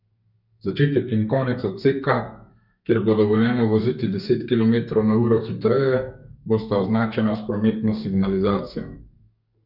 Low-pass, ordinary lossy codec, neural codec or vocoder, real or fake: 5.4 kHz; none; codec, 32 kHz, 1.9 kbps, SNAC; fake